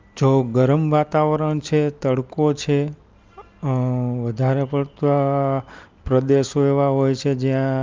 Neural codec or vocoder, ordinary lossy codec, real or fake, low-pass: none; Opus, 32 kbps; real; 7.2 kHz